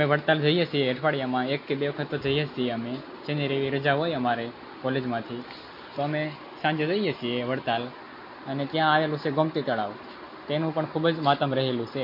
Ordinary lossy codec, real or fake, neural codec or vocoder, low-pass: MP3, 32 kbps; real; none; 5.4 kHz